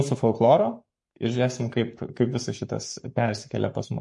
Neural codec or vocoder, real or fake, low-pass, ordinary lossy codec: codec, 44.1 kHz, 7.8 kbps, Pupu-Codec; fake; 10.8 kHz; MP3, 48 kbps